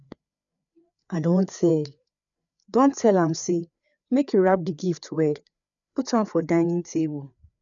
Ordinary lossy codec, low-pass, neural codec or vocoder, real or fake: none; 7.2 kHz; codec, 16 kHz, 4 kbps, FreqCodec, larger model; fake